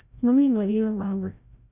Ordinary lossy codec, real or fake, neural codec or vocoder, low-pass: none; fake; codec, 16 kHz, 0.5 kbps, FreqCodec, larger model; 3.6 kHz